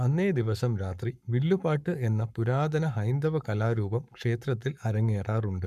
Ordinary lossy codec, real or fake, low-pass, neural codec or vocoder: none; fake; 14.4 kHz; codec, 44.1 kHz, 7.8 kbps, Pupu-Codec